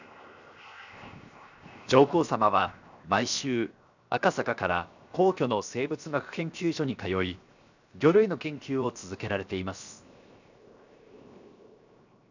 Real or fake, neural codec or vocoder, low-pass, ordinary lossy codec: fake; codec, 16 kHz, 0.7 kbps, FocalCodec; 7.2 kHz; none